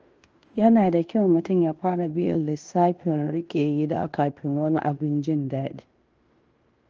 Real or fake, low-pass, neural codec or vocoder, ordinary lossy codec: fake; 7.2 kHz; codec, 16 kHz in and 24 kHz out, 0.9 kbps, LongCat-Audio-Codec, fine tuned four codebook decoder; Opus, 24 kbps